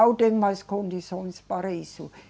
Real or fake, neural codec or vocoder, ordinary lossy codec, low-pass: real; none; none; none